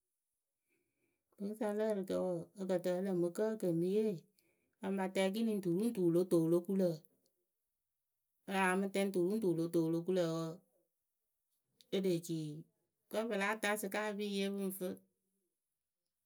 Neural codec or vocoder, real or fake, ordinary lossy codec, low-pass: none; real; none; none